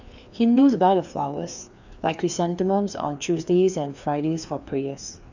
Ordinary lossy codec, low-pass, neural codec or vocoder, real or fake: none; 7.2 kHz; codec, 16 kHz, 2 kbps, FreqCodec, larger model; fake